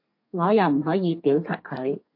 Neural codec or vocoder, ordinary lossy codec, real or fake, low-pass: codec, 32 kHz, 1.9 kbps, SNAC; MP3, 32 kbps; fake; 5.4 kHz